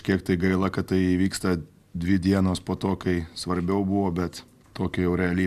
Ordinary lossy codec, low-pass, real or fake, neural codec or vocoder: MP3, 96 kbps; 14.4 kHz; real; none